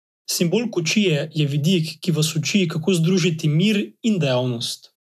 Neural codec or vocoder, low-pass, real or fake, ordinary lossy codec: none; 14.4 kHz; real; none